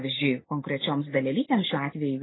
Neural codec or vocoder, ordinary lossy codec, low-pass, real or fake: none; AAC, 16 kbps; 7.2 kHz; real